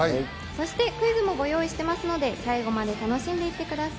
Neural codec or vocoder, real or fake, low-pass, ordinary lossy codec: none; real; none; none